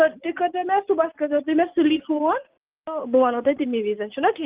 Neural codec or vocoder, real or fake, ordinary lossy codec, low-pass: none; real; Opus, 32 kbps; 3.6 kHz